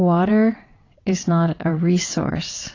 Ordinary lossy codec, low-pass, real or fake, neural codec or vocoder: AAC, 32 kbps; 7.2 kHz; fake; vocoder, 22.05 kHz, 80 mel bands, WaveNeXt